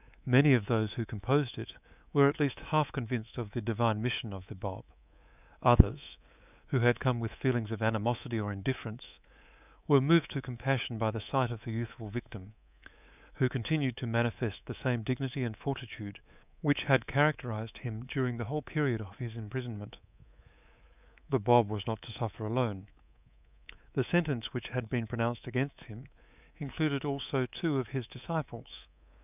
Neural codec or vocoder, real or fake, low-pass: codec, 24 kHz, 3.1 kbps, DualCodec; fake; 3.6 kHz